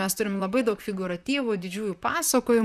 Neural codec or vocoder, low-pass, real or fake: vocoder, 44.1 kHz, 128 mel bands, Pupu-Vocoder; 14.4 kHz; fake